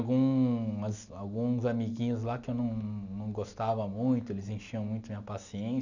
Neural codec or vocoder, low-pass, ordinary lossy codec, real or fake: none; 7.2 kHz; none; real